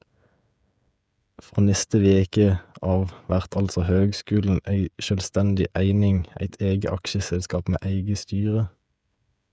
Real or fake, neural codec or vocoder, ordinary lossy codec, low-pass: fake; codec, 16 kHz, 16 kbps, FreqCodec, smaller model; none; none